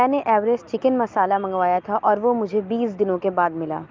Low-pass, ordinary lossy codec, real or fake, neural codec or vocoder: 7.2 kHz; Opus, 24 kbps; real; none